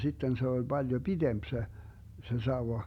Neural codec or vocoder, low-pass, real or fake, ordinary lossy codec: none; 19.8 kHz; real; none